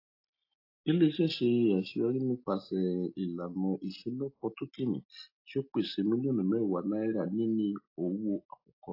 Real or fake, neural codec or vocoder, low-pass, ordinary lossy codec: real; none; 5.4 kHz; AAC, 32 kbps